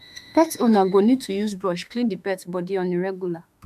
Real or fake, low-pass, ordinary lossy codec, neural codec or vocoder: fake; 14.4 kHz; none; autoencoder, 48 kHz, 32 numbers a frame, DAC-VAE, trained on Japanese speech